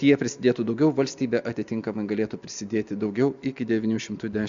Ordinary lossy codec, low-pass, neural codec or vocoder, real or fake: MP3, 64 kbps; 7.2 kHz; none; real